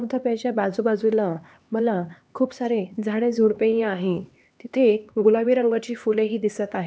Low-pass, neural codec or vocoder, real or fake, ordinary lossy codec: none; codec, 16 kHz, 2 kbps, X-Codec, HuBERT features, trained on LibriSpeech; fake; none